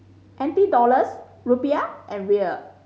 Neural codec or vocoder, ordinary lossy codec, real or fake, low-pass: none; none; real; none